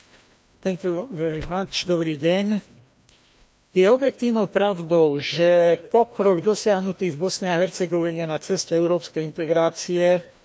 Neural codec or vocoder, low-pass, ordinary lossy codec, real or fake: codec, 16 kHz, 1 kbps, FreqCodec, larger model; none; none; fake